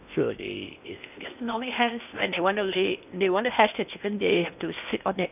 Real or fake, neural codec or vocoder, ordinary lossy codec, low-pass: fake; codec, 16 kHz in and 24 kHz out, 0.8 kbps, FocalCodec, streaming, 65536 codes; none; 3.6 kHz